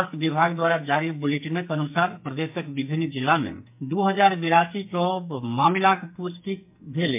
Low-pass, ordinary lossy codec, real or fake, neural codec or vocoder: 3.6 kHz; MP3, 32 kbps; fake; codec, 44.1 kHz, 2.6 kbps, SNAC